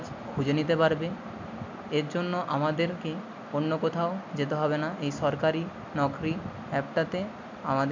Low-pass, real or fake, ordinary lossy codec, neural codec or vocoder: 7.2 kHz; real; MP3, 64 kbps; none